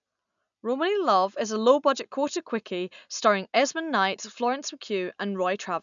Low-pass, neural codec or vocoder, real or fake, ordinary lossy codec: 7.2 kHz; none; real; none